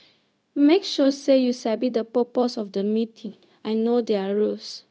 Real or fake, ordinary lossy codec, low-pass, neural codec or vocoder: fake; none; none; codec, 16 kHz, 0.4 kbps, LongCat-Audio-Codec